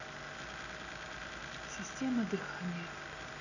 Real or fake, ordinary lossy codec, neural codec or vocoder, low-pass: real; none; none; 7.2 kHz